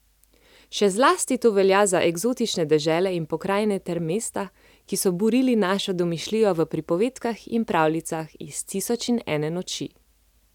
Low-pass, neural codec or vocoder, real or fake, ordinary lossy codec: 19.8 kHz; none; real; none